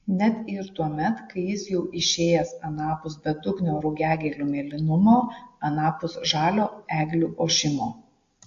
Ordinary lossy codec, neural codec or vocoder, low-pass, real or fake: AAC, 48 kbps; none; 7.2 kHz; real